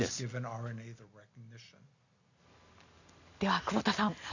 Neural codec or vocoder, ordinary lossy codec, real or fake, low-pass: none; none; real; 7.2 kHz